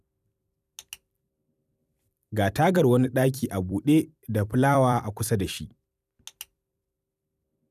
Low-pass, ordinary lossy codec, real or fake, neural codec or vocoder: 14.4 kHz; none; fake; vocoder, 44.1 kHz, 128 mel bands every 256 samples, BigVGAN v2